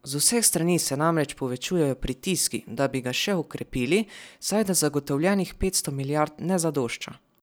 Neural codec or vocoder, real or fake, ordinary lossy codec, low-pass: none; real; none; none